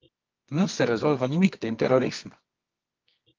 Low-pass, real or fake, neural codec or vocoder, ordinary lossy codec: 7.2 kHz; fake; codec, 24 kHz, 0.9 kbps, WavTokenizer, medium music audio release; Opus, 24 kbps